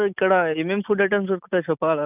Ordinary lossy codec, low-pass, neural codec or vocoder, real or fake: none; 3.6 kHz; none; real